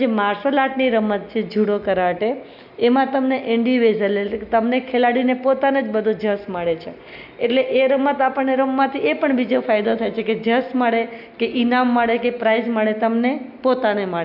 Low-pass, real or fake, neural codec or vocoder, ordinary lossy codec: 5.4 kHz; real; none; none